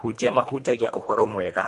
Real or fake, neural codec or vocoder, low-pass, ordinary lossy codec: fake; codec, 24 kHz, 1.5 kbps, HILCodec; 10.8 kHz; AAC, 48 kbps